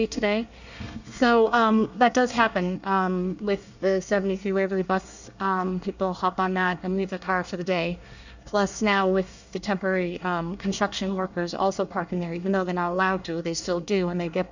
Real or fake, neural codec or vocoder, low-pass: fake; codec, 24 kHz, 1 kbps, SNAC; 7.2 kHz